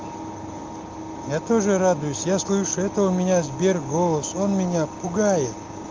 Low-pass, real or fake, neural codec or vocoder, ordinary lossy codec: 7.2 kHz; real; none; Opus, 32 kbps